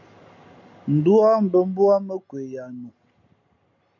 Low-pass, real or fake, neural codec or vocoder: 7.2 kHz; real; none